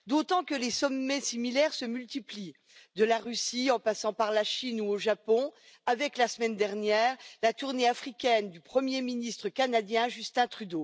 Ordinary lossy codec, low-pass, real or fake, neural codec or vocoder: none; none; real; none